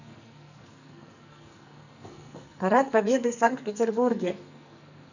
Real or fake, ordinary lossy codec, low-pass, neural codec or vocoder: fake; none; 7.2 kHz; codec, 44.1 kHz, 2.6 kbps, SNAC